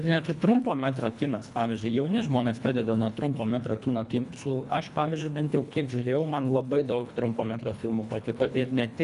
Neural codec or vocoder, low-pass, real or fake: codec, 24 kHz, 1.5 kbps, HILCodec; 10.8 kHz; fake